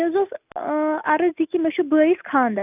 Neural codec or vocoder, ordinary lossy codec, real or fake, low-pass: none; none; real; 3.6 kHz